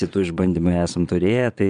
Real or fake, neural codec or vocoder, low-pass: fake; vocoder, 22.05 kHz, 80 mel bands, Vocos; 9.9 kHz